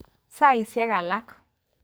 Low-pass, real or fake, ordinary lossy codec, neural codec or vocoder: none; fake; none; codec, 44.1 kHz, 2.6 kbps, SNAC